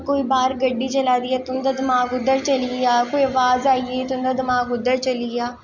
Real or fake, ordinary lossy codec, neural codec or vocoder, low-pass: real; none; none; 7.2 kHz